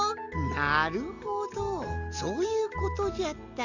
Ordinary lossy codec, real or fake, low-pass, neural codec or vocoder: none; real; 7.2 kHz; none